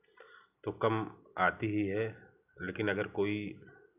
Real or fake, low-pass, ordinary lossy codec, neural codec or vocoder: real; 3.6 kHz; none; none